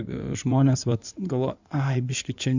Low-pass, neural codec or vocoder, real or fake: 7.2 kHz; codec, 16 kHz in and 24 kHz out, 2.2 kbps, FireRedTTS-2 codec; fake